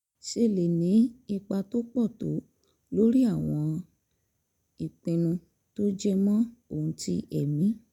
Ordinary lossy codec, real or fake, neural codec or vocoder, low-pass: none; real; none; 19.8 kHz